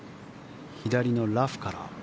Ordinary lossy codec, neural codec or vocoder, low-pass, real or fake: none; none; none; real